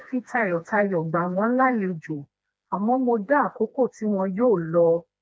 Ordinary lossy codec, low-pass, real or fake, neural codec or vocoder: none; none; fake; codec, 16 kHz, 2 kbps, FreqCodec, smaller model